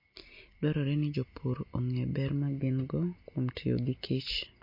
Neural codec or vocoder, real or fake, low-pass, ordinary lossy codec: none; real; 5.4 kHz; MP3, 32 kbps